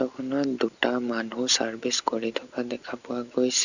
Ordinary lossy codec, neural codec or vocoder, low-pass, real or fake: none; none; 7.2 kHz; real